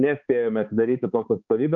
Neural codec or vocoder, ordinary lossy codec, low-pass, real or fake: codec, 16 kHz, 4 kbps, X-Codec, HuBERT features, trained on balanced general audio; Opus, 64 kbps; 7.2 kHz; fake